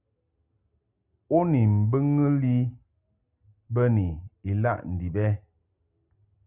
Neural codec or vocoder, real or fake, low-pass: none; real; 3.6 kHz